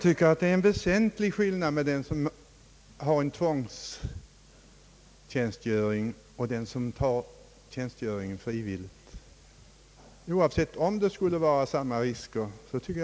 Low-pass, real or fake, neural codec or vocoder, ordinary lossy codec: none; real; none; none